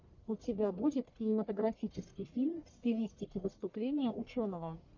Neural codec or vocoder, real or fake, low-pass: codec, 44.1 kHz, 1.7 kbps, Pupu-Codec; fake; 7.2 kHz